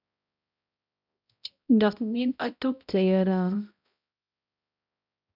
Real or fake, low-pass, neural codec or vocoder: fake; 5.4 kHz; codec, 16 kHz, 0.5 kbps, X-Codec, HuBERT features, trained on balanced general audio